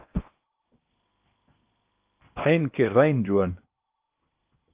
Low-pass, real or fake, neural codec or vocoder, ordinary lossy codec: 3.6 kHz; fake; codec, 16 kHz in and 24 kHz out, 0.8 kbps, FocalCodec, streaming, 65536 codes; Opus, 24 kbps